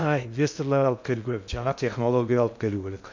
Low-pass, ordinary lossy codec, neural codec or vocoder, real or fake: 7.2 kHz; MP3, 64 kbps; codec, 16 kHz in and 24 kHz out, 0.6 kbps, FocalCodec, streaming, 2048 codes; fake